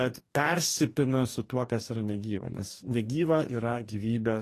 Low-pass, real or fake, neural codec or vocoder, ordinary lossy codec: 14.4 kHz; fake; codec, 44.1 kHz, 2.6 kbps, DAC; AAC, 48 kbps